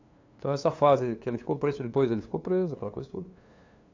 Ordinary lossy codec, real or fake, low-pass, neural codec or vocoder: AAC, 48 kbps; fake; 7.2 kHz; codec, 16 kHz, 2 kbps, FunCodec, trained on LibriTTS, 25 frames a second